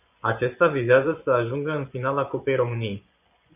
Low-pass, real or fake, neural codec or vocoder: 3.6 kHz; fake; vocoder, 24 kHz, 100 mel bands, Vocos